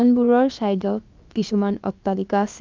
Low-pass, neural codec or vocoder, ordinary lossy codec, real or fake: 7.2 kHz; codec, 16 kHz, about 1 kbps, DyCAST, with the encoder's durations; Opus, 24 kbps; fake